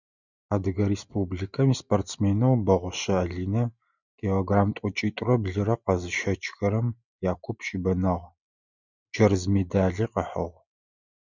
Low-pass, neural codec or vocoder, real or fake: 7.2 kHz; none; real